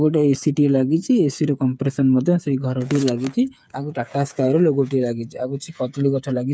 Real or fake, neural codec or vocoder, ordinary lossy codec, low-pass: fake; codec, 16 kHz, 8 kbps, FreqCodec, smaller model; none; none